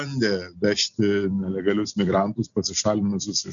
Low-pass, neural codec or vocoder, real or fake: 7.2 kHz; none; real